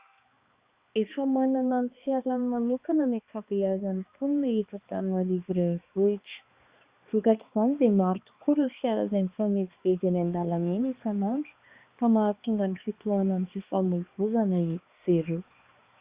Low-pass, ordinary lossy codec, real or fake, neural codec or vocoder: 3.6 kHz; Opus, 64 kbps; fake; codec, 16 kHz, 2 kbps, X-Codec, HuBERT features, trained on balanced general audio